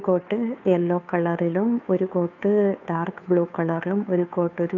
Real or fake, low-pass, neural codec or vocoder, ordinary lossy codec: fake; 7.2 kHz; codec, 16 kHz, 2 kbps, FunCodec, trained on Chinese and English, 25 frames a second; none